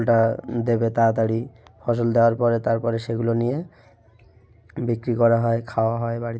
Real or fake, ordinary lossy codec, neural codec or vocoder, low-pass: real; none; none; none